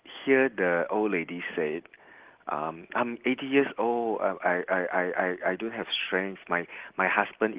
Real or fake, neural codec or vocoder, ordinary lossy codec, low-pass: real; none; Opus, 16 kbps; 3.6 kHz